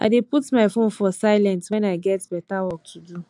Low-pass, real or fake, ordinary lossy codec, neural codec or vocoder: 10.8 kHz; real; AAC, 64 kbps; none